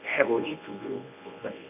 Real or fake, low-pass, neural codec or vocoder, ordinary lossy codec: fake; 3.6 kHz; codec, 24 kHz, 0.9 kbps, WavTokenizer, medium speech release version 1; AAC, 32 kbps